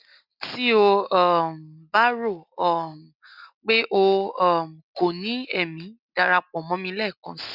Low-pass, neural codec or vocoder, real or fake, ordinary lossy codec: 5.4 kHz; none; real; none